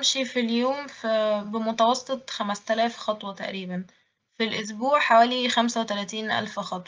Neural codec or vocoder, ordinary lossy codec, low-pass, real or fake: none; Opus, 24 kbps; 7.2 kHz; real